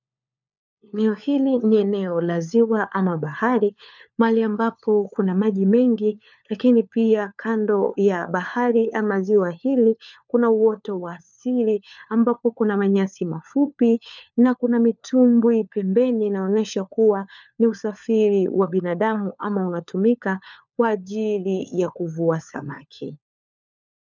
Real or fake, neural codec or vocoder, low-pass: fake; codec, 16 kHz, 4 kbps, FunCodec, trained on LibriTTS, 50 frames a second; 7.2 kHz